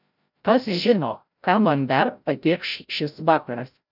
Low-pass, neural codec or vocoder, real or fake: 5.4 kHz; codec, 16 kHz, 0.5 kbps, FreqCodec, larger model; fake